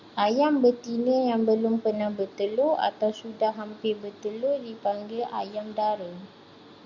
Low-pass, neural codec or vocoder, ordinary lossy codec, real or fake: 7.2 kHz; none; Opus, 64 kbps; real